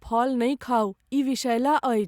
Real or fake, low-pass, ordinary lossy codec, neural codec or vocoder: real; 19.8 kHz; Opus, 64 kbps; none